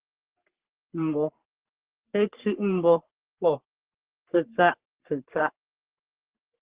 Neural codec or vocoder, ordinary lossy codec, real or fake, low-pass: codec, 44.1 kHz, 3.4 kbps, Pupu-Codec; Opus, 16 kbps; fake; 3.6 kHz